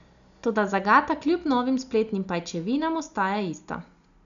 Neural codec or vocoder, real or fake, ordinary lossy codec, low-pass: none; real; none; 7.2 kHz